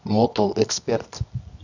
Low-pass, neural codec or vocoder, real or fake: 7.2 kHz; codec, 24 kHz, 0.9 kbps, WavTokenizer, medium music audio release; fake